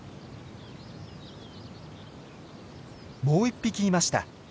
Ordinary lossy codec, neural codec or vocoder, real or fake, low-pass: none; none; real; none